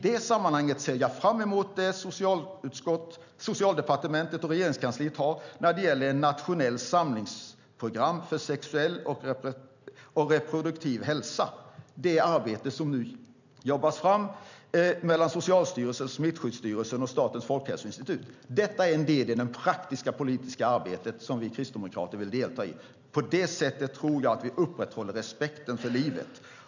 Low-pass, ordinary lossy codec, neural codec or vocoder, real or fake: 7.2 kHz; none; none; real